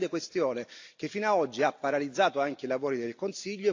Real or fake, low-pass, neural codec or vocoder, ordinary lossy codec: real; 7.2 kHz; none; AAC, 48 kbps